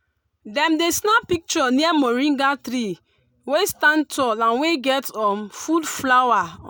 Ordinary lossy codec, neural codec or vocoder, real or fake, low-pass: none; none; real; none